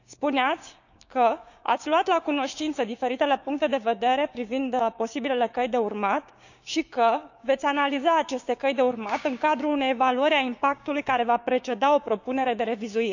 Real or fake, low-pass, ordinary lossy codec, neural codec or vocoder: fake; 7.2 kHz; none; codec, 16 kHz, 6 kbps, DAC